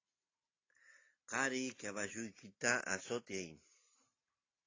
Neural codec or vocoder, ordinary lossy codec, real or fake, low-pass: none; AAC, 32 kbps; real; 7.2 kHz